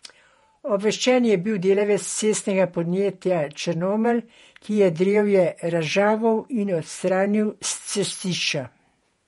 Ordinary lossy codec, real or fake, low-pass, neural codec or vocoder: MP3, 48 kbps; fake; 19.8 kHz; vocoder, 48 kHz, 128 mel bands, Vocos